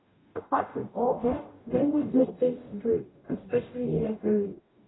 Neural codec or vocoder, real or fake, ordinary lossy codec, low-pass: codec, 44.1 kHz, 0.9 kbps, DAC; fake; AAC, 16 kbps; 7.2 kHz